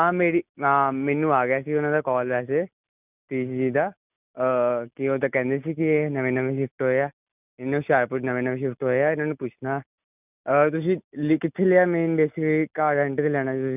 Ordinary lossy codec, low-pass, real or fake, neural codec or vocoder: none; 3.6 kHz; real; none